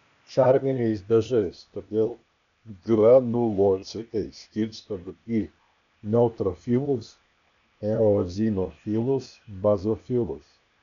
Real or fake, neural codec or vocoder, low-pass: fake; codec, 16 kHz, 0.8 kbps, ZipCodec; 7.2 kHz